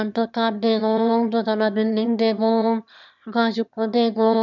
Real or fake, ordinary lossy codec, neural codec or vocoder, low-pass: fake; none; autoencoder, 22.05 kHz, a latent of 192 numbers a frame, VITS, trained on one speaker; 7.2 kHz